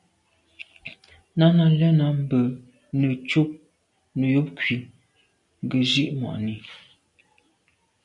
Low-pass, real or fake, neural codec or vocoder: 10.8 kHz; real; none